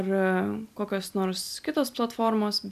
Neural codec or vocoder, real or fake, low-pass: none; real; 14.4 kHz